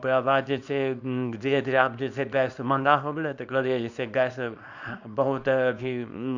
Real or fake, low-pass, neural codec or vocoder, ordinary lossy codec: fake; 7.2 kHz; codec, 24 kHz, 0.9 kbps, WavTokenizer, small release; none